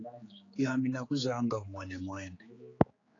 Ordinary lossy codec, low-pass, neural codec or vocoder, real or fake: AAC, 32 kbps; 7.2 kHz; codec, 16 kHz, 4 kbps, X-Codec, HuBERT features, trained on general audio; fake